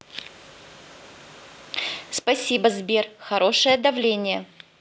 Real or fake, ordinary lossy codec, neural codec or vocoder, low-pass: real; none; none; none